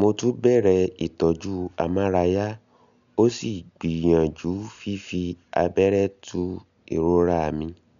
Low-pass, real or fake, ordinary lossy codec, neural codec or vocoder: 7.2 kHz; real; none; none